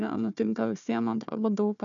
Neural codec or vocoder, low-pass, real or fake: codec, 16 kHz, 1 kbps, FunCodec, trained on Chinese and English, 50 frames a second; 7.2 kHz; fake